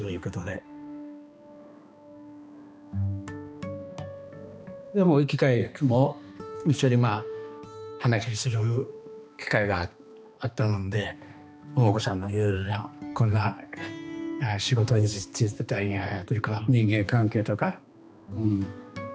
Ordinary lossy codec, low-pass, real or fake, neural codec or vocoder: none; none; fake; codec, 16 kHz, 2 kbps, X-Codec, HuBERT features, trained on balanced general audio